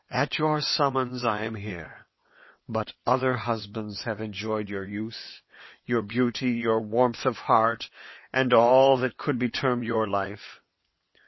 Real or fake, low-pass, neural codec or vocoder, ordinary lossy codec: fake; 7.2 kHz; vocoder, 22.05 kHz, 80 mel bands, WaveNeXt; MP3, 24 kbps